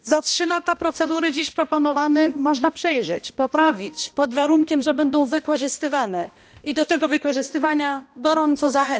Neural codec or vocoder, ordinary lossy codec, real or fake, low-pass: codec, 16 kHz, 1 kbps, X-Codec, HuBERT features, trained on balanced general audio; none; fake; none